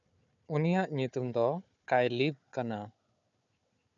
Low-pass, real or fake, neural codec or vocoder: 7.2 kHz; fake; codec, 16 kHz, 4 kbps, FunCodec, trained on Chinese and English, 50 frames a second